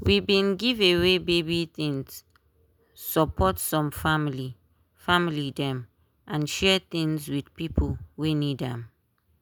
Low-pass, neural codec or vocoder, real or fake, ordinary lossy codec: none; none; real; none